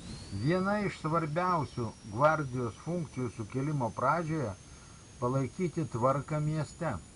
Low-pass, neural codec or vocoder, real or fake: 10.8 kHz; none; real